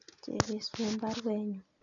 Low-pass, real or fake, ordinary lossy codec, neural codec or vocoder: 7.2 kHz; real; none; none